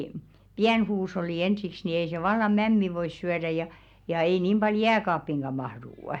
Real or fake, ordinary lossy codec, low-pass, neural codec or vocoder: real; none; 19.8 kHz; none